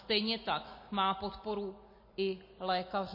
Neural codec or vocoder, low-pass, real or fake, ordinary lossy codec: none; 5.4 kHz; real; MP3, 24 kbps